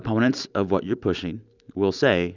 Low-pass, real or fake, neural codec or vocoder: 7.2 kHz; real; none